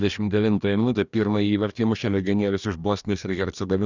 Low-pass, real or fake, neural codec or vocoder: 7.2 kHz; fake; codec, 44.1 kHz, 2.6 kbps, DAC